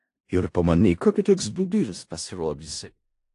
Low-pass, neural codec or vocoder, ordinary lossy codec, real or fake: 10.8 kHz; codec, 16 kHz in and 24 kHz out, 0.4 kbps, LongCat-Audio-Codec, four codebook decoder; AAC, 48 kbps; fake